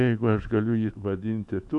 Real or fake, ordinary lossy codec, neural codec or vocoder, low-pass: fake; AAC, 64 kbps; autoencoder, 48 kHz, 128 numbers a frame, DAC-VAE, trained on Japanese speech; 10.8 kHz